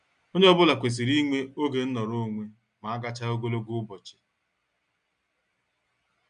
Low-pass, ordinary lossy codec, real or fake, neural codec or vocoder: 9.9 kHz; none; real; none